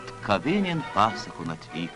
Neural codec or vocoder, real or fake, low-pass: none; real; 10.8 kHz